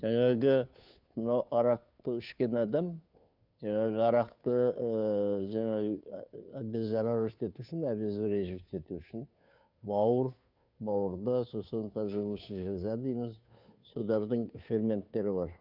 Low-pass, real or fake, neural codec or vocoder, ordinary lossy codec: 5.4 kHz; fake; codec, 16 kHz, 2 kbps, FunCodec, trained on Chinese and English, 25 frames a second; none